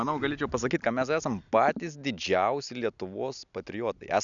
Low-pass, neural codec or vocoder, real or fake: 7.2 kHz; none; real